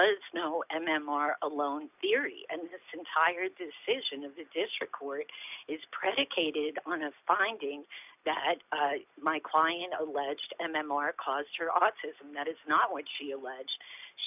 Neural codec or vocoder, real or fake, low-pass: none; real; 3.6 kHz